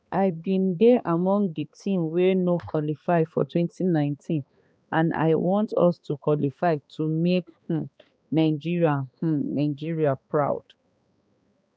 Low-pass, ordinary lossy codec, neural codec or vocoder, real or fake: none; none; codec, 16 kHz, 2 kbps, X-Codec, HuBERT features, trained on balanced general audio; fake